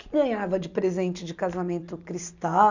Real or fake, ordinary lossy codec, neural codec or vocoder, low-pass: real; none; none; 7.2 kHz